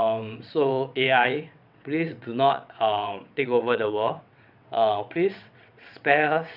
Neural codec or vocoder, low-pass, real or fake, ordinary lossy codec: vocoder, 22.05 kHz, 80 mel bands, WaveNeXt; 5.4 kHz; fake; none